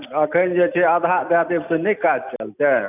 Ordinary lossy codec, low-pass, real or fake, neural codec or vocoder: none; 3.6 kHz; real; none